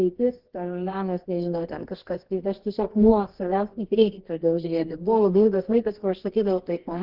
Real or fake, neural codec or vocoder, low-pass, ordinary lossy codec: fake; codec, 24 kHz, 0.9 kbps, WavTokenizer, medium music audio release; 5.4 kHz; Opus, 16 kbps